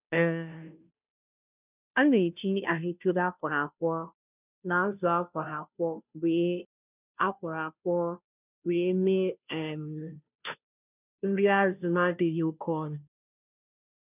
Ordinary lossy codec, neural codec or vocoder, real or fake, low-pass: none; codec, 16 kHz, 0.5 kbps, FunCodec, trained on Chinese and English, 25 frames a second; fake; 3.6 kHz